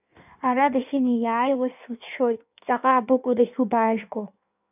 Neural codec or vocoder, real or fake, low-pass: codec, 16 kHz in and 24 kHz out, 1.1 kbps, FireRedTTS-2 codec; fake; 3.6 kHz